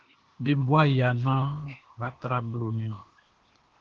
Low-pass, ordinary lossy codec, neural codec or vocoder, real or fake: 7.2 kHz; Opus, 16 kbps; codec, 16 kHz, 0.8 kbps, ZipCodec; fake